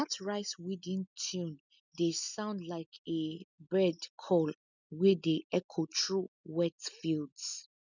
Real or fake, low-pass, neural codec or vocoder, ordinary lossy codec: real; 7.2 kHz; none; none